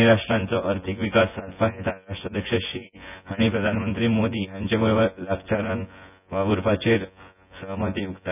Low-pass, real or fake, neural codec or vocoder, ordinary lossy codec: 3.6 kHz; fake; vocoder, 24 kHz, 100 mel bands, Vocos; none